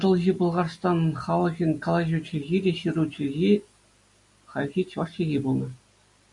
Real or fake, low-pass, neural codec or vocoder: real; 10.8 kHz; none